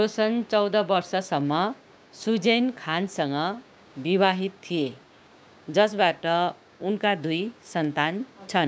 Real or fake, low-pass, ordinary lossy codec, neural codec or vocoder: fake; none; none; codec, 16 kHz, 6 kbps, DAC